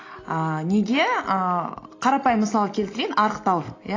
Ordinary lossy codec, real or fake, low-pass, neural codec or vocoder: AAC, 32 kbps; real; 7.2 kHz; none